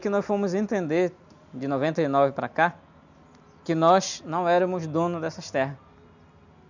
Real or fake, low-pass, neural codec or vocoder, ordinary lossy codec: real; 7.2 kHz; none; none